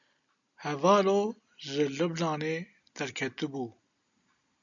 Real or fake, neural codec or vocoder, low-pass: real; none; 7.2 kHz